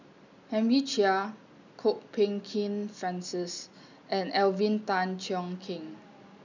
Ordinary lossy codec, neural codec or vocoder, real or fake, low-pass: none; none; real; 7.2 kHz